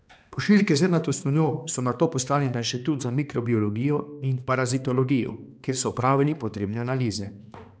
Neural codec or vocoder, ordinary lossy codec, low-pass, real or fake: codec, 16 kHz, 2 kbps, X-Codec, HuBERT features, trained on balanced general audio; none; none; fake